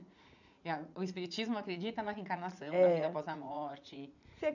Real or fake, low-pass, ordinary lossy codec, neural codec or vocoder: fake; 7.2 kHz; none; vocoder, 44.1 kHz, 80 mel bands, Vocos